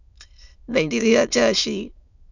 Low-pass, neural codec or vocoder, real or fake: 7.2 kHz; autoencoder, 22.05 kHz, a latent of 192 numbers a frame, VITS, trained on many speakers; fake